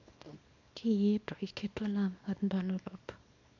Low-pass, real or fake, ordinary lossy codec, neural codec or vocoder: 7.2 kHz; fake; AAC, 48 kbps; codec, 24 kHz, 0.9 kbps, WavTokenizer, small release